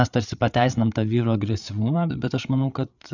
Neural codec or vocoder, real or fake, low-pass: codec, 16 kHz, 8 kbps, FreqCodec, larger model; fake; 7.2 kHz